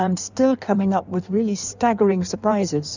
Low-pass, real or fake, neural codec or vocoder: 7.2 kHz; fake; codec, 16 kHz in and 24 kHz out, 1.1 kbps, FireRedTTS-2 codec